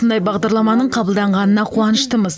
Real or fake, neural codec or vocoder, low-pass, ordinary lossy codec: real; none; none; none